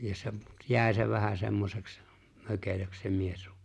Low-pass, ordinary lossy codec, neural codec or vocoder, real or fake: none; none; none; real